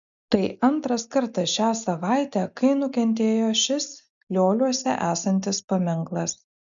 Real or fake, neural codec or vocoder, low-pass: real; none; 7.2 kHz